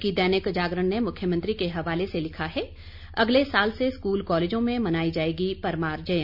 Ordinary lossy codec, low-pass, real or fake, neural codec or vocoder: none; 5.4 kHz; real; none